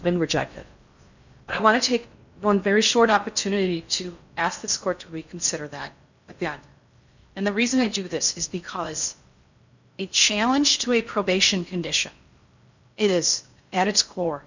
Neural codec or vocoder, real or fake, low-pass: codec, 16 kHz in and 24 kHz out, 0.6 kbps, FocalCodec, streaming, 4096 codes; fake; 7.2 kHz